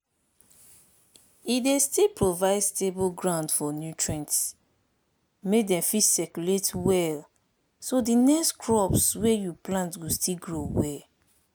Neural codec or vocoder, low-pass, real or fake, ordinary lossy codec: none; none; real; none